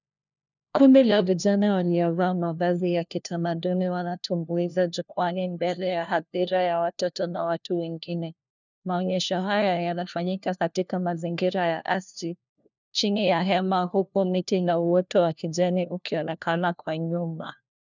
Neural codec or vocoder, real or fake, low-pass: codec, 16 kHz, 1 kbps, FunCodec, trained on LibriTTS, 50 frames a second; fake; 7.2 kHz